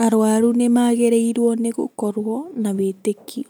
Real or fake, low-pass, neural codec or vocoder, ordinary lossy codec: real; none; none; none